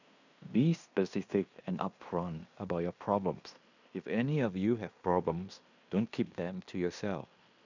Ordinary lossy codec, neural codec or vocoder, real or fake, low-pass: none; codec, 16 kHz in and 24 kHz out, 0.9 kbps, LongCat-Audio-Codec, fine tuned four codebook decoder; fake; 7.2 kHz